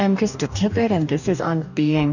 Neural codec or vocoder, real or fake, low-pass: codec, 44.1 kHz, 2.6 kbps, DAC; fake; 7.2 kHz